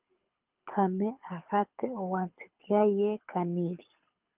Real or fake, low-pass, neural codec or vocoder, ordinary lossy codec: fake; 3.6 kHz; codec, 24 kHz, 6 kbps, HILCodec; Opus, 24 kbps